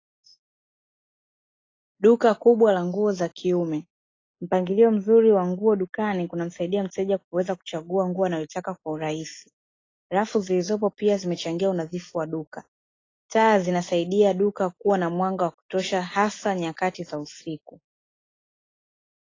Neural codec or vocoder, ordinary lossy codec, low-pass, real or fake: none; AAC, 32 kbps; 7.2 kHz; real